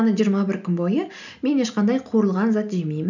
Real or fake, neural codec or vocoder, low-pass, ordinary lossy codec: real; none; 7.2 kHz; none